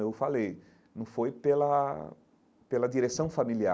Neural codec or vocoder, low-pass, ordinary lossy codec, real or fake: none; none; none; real